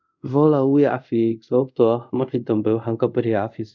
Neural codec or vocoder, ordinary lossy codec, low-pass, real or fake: codec, 24 kHz, 0.5 kbps, DualCodec; none; 7.2 kHz; fake